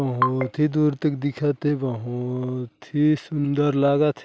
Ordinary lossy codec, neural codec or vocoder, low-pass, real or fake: none; none; none; real